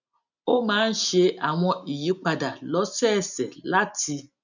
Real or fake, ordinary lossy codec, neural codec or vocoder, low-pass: real; none; none; 7.2 kHz